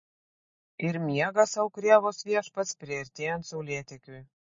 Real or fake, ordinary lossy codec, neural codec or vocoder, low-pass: real; MP3, 32 kbps; none; 7.2 kHz